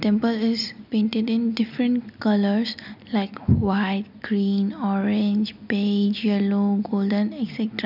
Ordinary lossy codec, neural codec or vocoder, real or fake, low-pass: none; none; real; 5.4 kHz